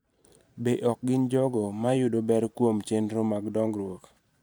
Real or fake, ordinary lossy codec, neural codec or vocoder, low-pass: fake; none; vocoder, 44.1 kHz, 128 mel bands every 512 samples, BigVGAN v2; none